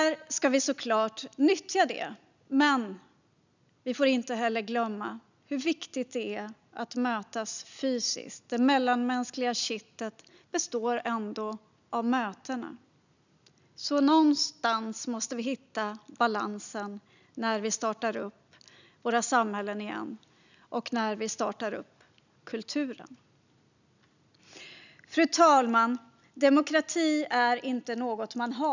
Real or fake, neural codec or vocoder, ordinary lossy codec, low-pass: real; none; none; 7.2 kHz